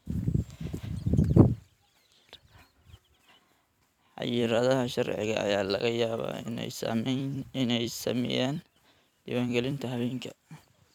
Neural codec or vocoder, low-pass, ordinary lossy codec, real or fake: vocoder, 44.1 kHz, 128 mel bands every 256 samples, BigVGAN v2; 19.8 kHz; none; fake